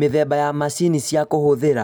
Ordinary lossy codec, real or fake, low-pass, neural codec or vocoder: none; real; none; none